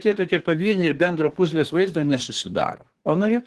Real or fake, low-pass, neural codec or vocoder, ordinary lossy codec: fake; 10.8 kHz; codec, 24 kHz, 1 kbps, SNAC; Opus, 16 kbps